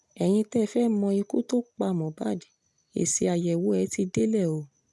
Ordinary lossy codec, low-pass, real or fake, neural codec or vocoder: none; none; real; none